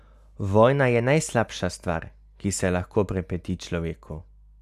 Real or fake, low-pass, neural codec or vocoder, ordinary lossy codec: real; 14.4 kHz; none; none